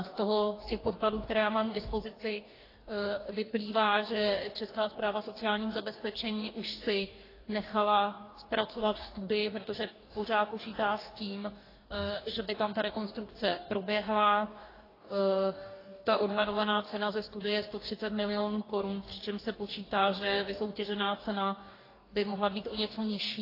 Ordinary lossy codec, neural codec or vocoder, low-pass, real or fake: AAC, 24 kbps; codec, 44.1 kHz, 2.6 kbps, DAC; 5.4 kHz; fake